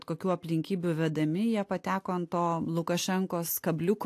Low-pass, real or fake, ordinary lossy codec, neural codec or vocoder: 14.4 kHz; real; AAC, 64 kbps; none